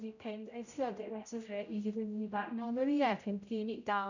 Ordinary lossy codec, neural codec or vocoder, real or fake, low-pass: none; codec, 16 kHz, 0.5 kbps, X-Codec, HuBERT features, trained on general audio; fake; 7.2 kHz